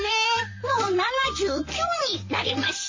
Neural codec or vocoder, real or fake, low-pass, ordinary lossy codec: vocoder, 44.1 kHz, 128 mel bands, Pupu-Vocoder; fake; 7.2 kHz; MP3, 32 kbps